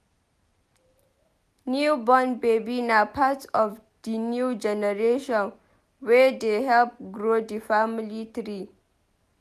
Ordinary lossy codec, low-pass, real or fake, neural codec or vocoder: none; 14.4 kHz; real; none